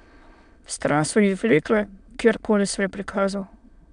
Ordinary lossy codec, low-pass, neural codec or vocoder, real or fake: MP3, 96 kbps; 9.9 kHz; autoencoder, 22.05 kHz, a latent of 192 numbers a frame, VITS, trained on many speakers; fake